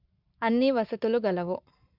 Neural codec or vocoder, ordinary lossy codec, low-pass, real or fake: codec, 44.1 kHz, 7.8 kbps, Pupu-Codec; MP3, 48 kbps; 5.4 kHz; fake